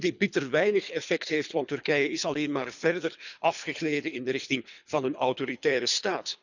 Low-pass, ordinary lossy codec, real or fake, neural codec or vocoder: 7.2 kHz; none; fake; codec, 24 kHz, 3 kbps, HILCodec